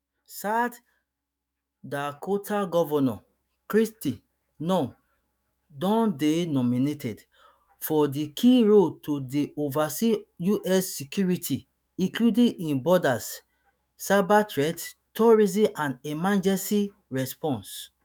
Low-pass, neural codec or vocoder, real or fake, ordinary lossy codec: none; autoencoder, 48 kHz, 128 numbers a frame, DAC-VAE, trained on Japanese speech; fake; none